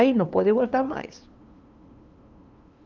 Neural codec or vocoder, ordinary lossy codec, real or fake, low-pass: codec, 16 kHz, 2 kbps, FunCodec, trained on LibriTTS, 25 frames a second; Opus, 24 kbps; fake; 7.2 kHz